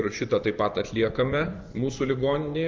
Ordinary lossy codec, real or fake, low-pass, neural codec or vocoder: Opus, 24 kbps; fake; 7.2 kHz; vocoder, 24 kHz, 100 mel bands, Vocos